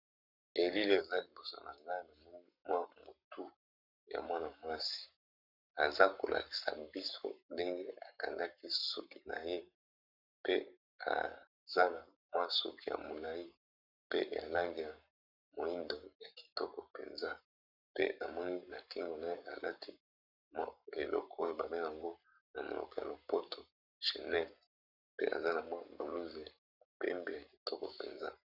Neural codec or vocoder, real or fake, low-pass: codec, 44.1 kHz, 7.8 kbps, Pupu-Codec; fake; 5.4 kHz